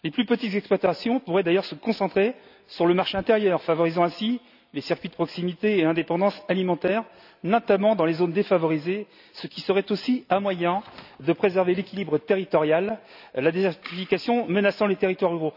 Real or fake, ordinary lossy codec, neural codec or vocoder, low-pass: real; none; none; 5.4 kHz